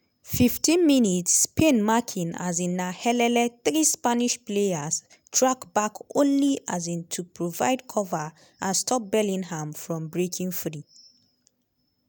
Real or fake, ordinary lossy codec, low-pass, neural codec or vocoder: real; none; none; none